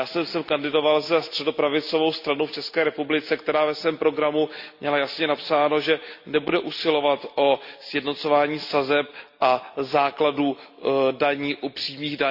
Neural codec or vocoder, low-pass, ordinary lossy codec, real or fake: none; 5.4 kHz; Opus, 64 kbps; real